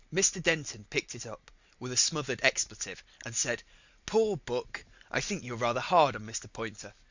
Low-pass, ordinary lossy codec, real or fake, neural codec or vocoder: 7.2 kHz; Opus, 64 kbps; real; none